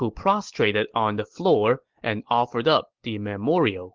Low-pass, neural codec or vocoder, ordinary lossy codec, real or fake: 7.2 kHz; none; Opus, 16 kbps; real